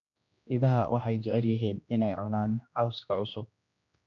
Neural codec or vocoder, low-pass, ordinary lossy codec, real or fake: codec, 16 kHz, 1 kbps, X-Codec, HuBERT features, trained on general audio; 7.2 kHz; none; fake